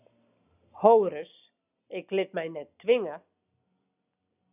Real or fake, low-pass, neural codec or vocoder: real; 3.6 kHz; none